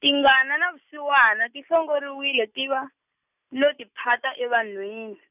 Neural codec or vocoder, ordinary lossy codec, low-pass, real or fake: none; none; 3.6 kHz; real